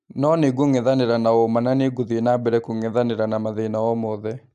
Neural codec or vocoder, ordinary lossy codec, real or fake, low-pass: none; AAC, 96 kbps; real; 10.8 kHz